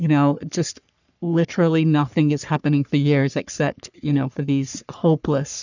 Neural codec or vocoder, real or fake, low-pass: codec, 44.1 kHz, 3.4 kbps, Pupu-Codec; fake; 7.2 kHz